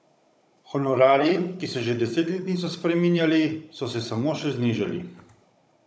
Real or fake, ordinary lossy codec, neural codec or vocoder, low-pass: fake; none; codec, 16 kHz, 16 kbps, FunCodec, trained on Chinese and English, 50 frames a second; none